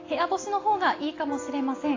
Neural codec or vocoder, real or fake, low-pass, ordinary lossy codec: none; real; 7.2 kHz; AAC, 32 kbps